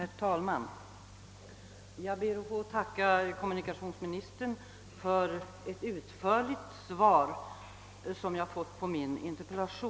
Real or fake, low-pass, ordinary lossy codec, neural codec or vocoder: real; none; none; none